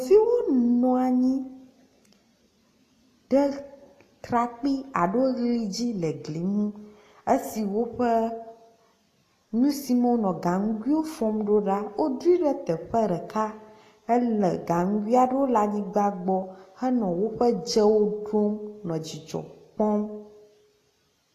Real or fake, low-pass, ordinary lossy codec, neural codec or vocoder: real; 14.4 kHz; AAC, 48 kbps; none